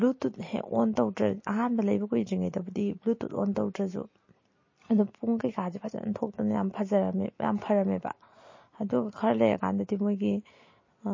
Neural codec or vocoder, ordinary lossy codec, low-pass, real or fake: none; MP3, 32 kbps; 7.2 kHz; real